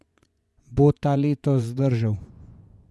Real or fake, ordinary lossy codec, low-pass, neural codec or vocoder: fake; none; none; vocoder, 24 kHz, 100 mel bands, Vocos